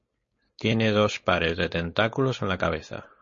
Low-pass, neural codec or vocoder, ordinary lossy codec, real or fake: 7.2 kHz; codec, 16 kHz, 4.8 kbps, FACodec; MP3, 32 kbps; fake